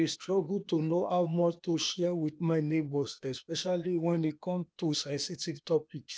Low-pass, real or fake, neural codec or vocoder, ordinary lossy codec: none; fake; codec, 16 kHz, 0.8 kbps, ZipCodec; none